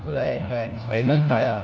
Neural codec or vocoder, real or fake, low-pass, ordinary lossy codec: codec, 16 kHz, 1 kbps, FunCodec, trained on LibriTTS, 50 frames a second; fake; none; none